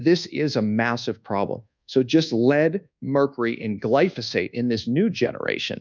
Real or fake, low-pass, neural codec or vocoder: fake; 7.2 kHz; codec, 24 kHz, 1.2 kbps, DualCodec